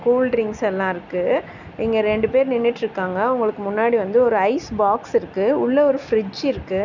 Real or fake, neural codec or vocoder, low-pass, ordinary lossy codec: real; none; 7.2 kHz; none